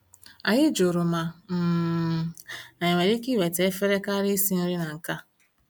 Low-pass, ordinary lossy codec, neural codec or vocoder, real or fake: none; none; none; real